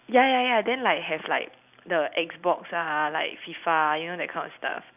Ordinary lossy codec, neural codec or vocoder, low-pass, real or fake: none; none; 3.6 kHz; real